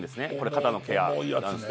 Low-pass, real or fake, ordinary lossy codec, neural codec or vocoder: none; real; none; none